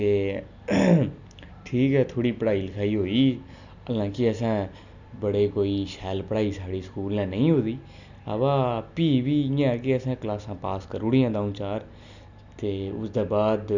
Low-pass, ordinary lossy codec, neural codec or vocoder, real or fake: 7.2 kHz; none; none; real